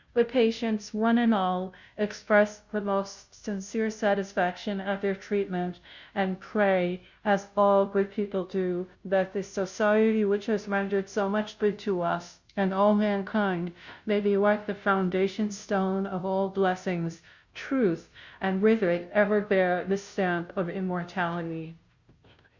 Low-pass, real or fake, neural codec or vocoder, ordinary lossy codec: 7.2 kHz; fake; codec, 16 kHz, 0.5 kbps, FunCodec, trained on Chinese and English, 25 frames a second; Opus, 64 kbps